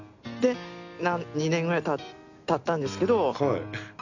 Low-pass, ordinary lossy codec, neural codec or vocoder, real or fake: 7.2 kHz; none; none; real